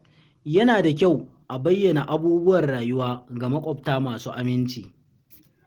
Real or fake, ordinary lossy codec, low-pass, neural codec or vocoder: real; Opus, 16 kbps; 19.8 kHz; none